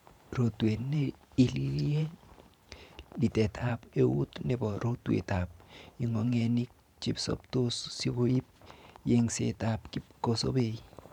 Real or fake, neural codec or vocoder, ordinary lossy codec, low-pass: fake; vocoder, 44.1 kHz, 128 mel bands every 512 samples, BigVGAN v2; none; 19.8 kHz